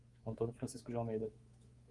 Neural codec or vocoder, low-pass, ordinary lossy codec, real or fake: codec, 24 kHz, 3.1 kbps, DualCodec; 10.8 kHz; Opus, 24 kbps; fake